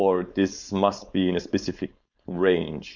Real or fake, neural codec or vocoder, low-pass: fake; codec, 16 kHz, 4.8 kbps, FACodec; 7.2 kHz